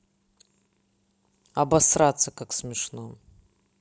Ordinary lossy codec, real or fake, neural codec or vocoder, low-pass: none; real; none; none